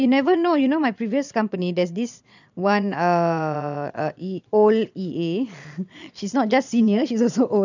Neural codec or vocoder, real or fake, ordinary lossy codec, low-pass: vocoder, 22.05 kHz, 80 mel bands, Vocos; fake; none; 7.2 kHz